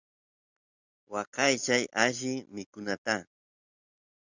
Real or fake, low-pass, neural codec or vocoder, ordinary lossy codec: real; 7.2 kHz; none; Opus, 64 kbps